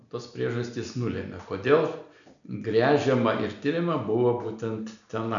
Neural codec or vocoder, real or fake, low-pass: none; real; 7.2 kHz